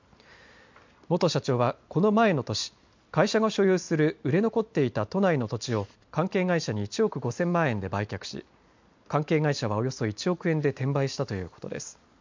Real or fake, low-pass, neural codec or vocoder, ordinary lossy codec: real; 7.2 kHz; none; MP3, 64 kbps